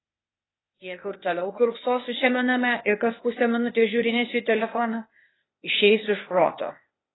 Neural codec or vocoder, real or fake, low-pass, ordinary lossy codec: codec, 16 kHz, 0.8 kbps, ZipCodec; fake; 7.2 kHz; AAC, 16 kbps